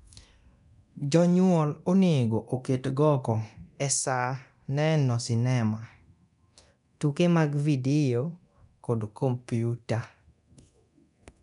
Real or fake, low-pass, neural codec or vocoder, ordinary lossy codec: fake; 10.8 kHz; codec, 24 kHz, 0.9 kbps, DualCodec; none